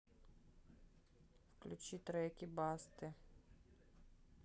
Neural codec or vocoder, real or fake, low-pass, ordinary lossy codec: none; real; none; none